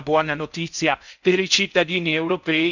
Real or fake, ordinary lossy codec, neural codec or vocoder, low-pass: fake; none; codec, 16 kHz in and 24 kHz out, 0.6 kbps, FocalCodec, streaming, 4096 codes; 7.2 kHz